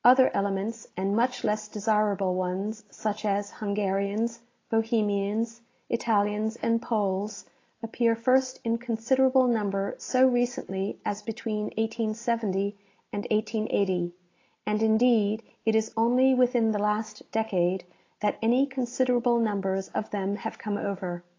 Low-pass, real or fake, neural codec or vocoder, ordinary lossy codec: 7.2 kHz; real; none; AAC, 32 kbps